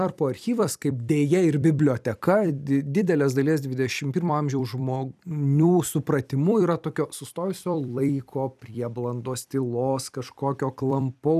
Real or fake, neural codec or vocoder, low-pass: fake; vocoder, 44.1 kHz, 128 mel bands every 256 samples, BigVGAN v2; 14.4 kHz